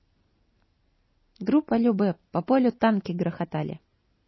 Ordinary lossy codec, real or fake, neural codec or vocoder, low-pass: MP3, 24 kbps; real; none; 7.2 kHz